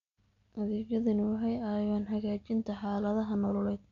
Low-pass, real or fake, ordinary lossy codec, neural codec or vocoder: 7.2 kHz; real; MP3, 64 kbps; none